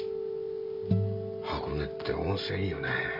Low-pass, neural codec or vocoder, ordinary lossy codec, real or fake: 5.4 kHz; none; none; real